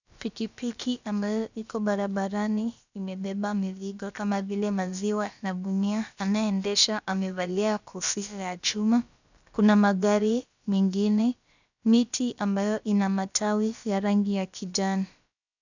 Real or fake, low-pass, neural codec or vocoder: fake; 7.2 kHz; codec, 16 kHz, about 1 kbps, DyCAST, with the encoder's durations